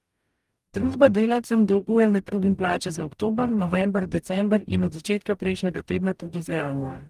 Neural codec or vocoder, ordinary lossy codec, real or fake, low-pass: codec, 44.1 kHz, 0.9 kbps, DAC; Opus, 32 kbps; fake; 14.4 kHz